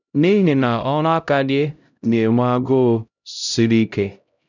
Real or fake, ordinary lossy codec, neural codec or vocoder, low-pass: fake; none; codec, 16 kHz, 0.5 kbps, X-Codec, HuBERT features, trained on LibriSpeech; 7.2 kHz